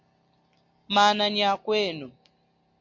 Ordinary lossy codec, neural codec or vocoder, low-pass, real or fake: MP3, 48 kbps; none; 7.2 kHz; real